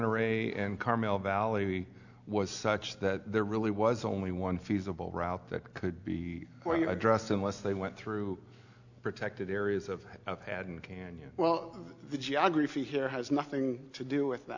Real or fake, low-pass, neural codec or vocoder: real; 7.2 kHz; none